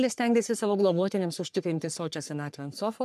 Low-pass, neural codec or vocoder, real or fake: 14.4 kHz; codec, 44.1 kHz, 3.4 kbps, Pupu-Codec; fake